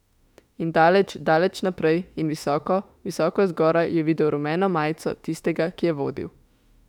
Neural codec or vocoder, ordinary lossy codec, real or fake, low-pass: autoencoder, 48 kHz, 32 numbers a frame, DAC-VAE, trained on Japanese speech; none; fake; 19.8 kHz